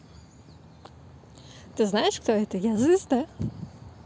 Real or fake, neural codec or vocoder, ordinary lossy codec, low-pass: real; none; none; none